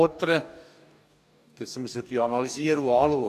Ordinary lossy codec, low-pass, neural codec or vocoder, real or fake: none; 14.4 kHz; codec, 44.1 kHz, 2.6 kbps, DAC; fake